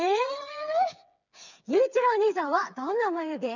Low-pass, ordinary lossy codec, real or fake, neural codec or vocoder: 7.2 kHz; none; fake; codec, 16 kHz, 4 kbps, FreqCodec, smaller model